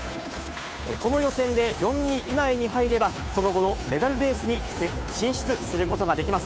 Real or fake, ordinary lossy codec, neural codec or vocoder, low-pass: fake; none; codec, 16 kHz, 2 kbps, FunCodec, trained on Chinese and English, 25 frames a second; none